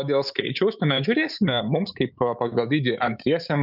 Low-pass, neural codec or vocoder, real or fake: 5.4 kHz; autoencoder, 48 kHz, 128 numbers a frame, DAC-VAE, trained on Japanese speech; fake